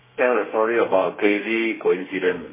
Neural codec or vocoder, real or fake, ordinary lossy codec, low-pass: codec, 32 kHz, 1.9 kbps, SNAC; fake; MP3, 16 kbps; 3.6 kHz